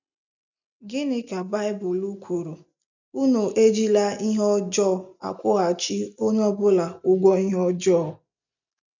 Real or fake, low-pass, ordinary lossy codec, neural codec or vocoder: real; 7.2 kHz; none; none